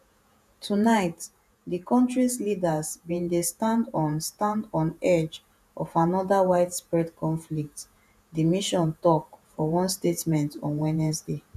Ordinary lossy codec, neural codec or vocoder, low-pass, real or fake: none; vocoder, 48 kHz, 128 mel bands, Vocos; 14.4 kHz; fake